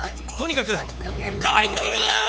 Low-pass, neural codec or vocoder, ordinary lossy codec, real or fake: none; codec, 16 kHz, 4 kbps, X-Codec, HuBERT features, trained on LibriSpeech; none; fake